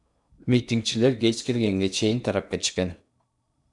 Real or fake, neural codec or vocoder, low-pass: fake; codec, 16 kHz in and 24 kHz out, 0.8 kbps, FocalCodec, streaming, 65536 codes; 10.8 kHz